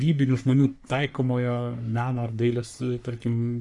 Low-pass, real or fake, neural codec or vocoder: 10.8 kHz; fake; codec, 44.1 kHz, 3.4 kbps, Pupu-Codec